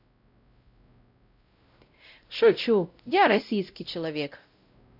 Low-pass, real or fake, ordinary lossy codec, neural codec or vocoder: 5.4 kHz; fake; none; codec, 16 kHz, 0.5 kbps, X-Codec, WavLM features, trained on Multilingual LibriSpeech